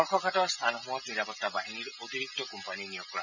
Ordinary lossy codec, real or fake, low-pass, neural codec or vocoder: none; real; 7.2 kHz; none